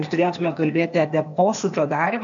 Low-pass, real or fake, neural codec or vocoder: 7.2 kHz; fake; codec, 16 kHz, 0.8 kbps, ZipCodec